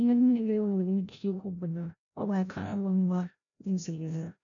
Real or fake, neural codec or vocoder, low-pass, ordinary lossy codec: fake; codec, 16 kHz, 0.5 kbps, FreqCodec, larger model; 7.2 kHz; none